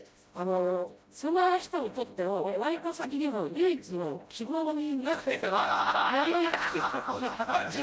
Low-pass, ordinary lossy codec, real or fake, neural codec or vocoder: none; none; fake; codec, 16 kHz, 0.5 kbps, FreqCodec, smaller model